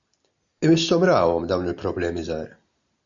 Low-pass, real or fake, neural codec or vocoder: 7.2 kHz; real; none